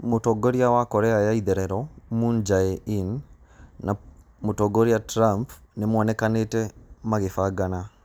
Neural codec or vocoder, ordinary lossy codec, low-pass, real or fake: none; none; none; real